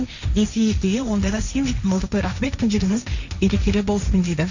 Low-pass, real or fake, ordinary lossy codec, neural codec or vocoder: 7.2 kHz; fake; none; codec, 16 kHz, 1.1 kbps, Voila-Tokenizer